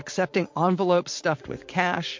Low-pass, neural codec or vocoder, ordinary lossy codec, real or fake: 7.2 kHz; vocoder, 44.1 kHz, 80 mel bands, Vocos; MP3, 48 kbps; fake